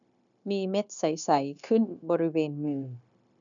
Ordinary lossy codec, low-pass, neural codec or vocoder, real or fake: none; 7.2 kHz; codec, 16 kHz, 0.9 kbps, LongCat-Audio-Codec; fake